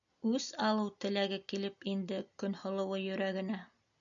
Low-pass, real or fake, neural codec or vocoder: 7.2 kHz; real; none